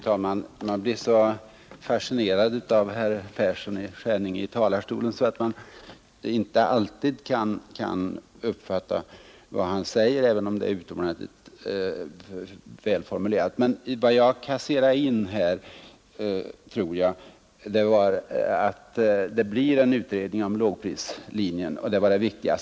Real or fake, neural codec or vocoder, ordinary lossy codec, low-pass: real; none; none; none